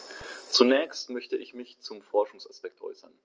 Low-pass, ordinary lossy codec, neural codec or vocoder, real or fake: 7.2 kHz; Opus, 24 kbps; none; real